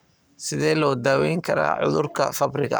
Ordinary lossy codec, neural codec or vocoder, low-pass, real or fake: none; codec, 44.1 kHz, 7.8 kbps, Pupu-Codec; none; fake